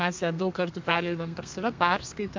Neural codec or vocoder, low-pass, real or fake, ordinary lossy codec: codec, 32 kHz, 1.9 kbps, SNAC; 7.2 kHz; fake; MP3, 64 kbps